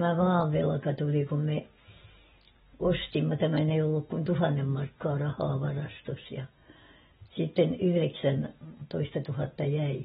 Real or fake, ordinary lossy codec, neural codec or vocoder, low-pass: real; AAC, 16 kbps; none; 19.8 kHz